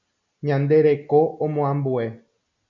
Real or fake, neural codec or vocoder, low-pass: real; none; 7.2 kHz